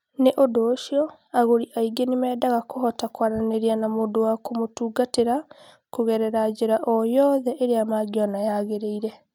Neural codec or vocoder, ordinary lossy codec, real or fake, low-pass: none; none; real; 19.8 kHz